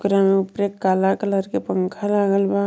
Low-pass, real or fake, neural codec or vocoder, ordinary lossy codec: none; real; none; none